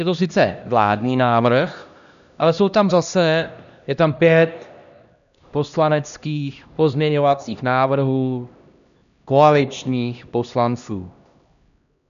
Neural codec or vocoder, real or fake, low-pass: codec, 16 kHz, 1 kbps, X-Codec, HuBERT features, trained on LibriSpeech; fake; 7.2 kHz